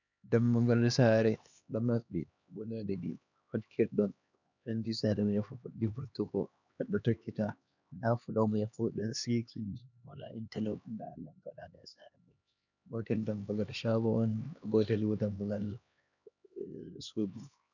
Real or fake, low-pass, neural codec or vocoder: fake; 7.2 kHz; codec, 16 kHz, 2 kbps, X-Codec, HuBERT features, trained on LibriSpeech